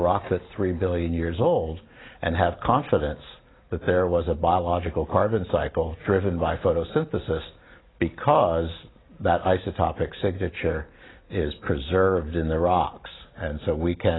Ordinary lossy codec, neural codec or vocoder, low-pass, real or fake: AAC, 16 kbps; none; 7.2 kHz; real